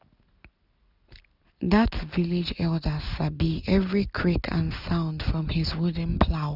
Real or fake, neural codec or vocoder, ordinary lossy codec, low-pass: real; none; none; 5.4 kHz